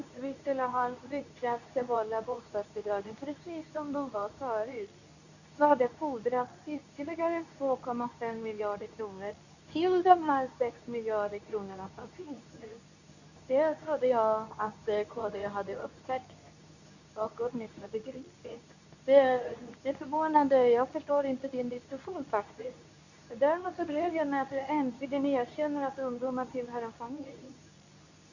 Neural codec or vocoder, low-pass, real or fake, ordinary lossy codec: codec, 24 kHz, 0.9 kbps, WavTokenizer, medium speech release version 2; 7.2 kHz; fake; none